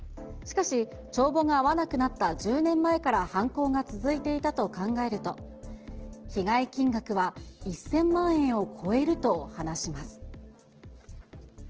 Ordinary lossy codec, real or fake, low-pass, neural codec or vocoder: Opus, 16 kbps; real; 7.2 kHz; none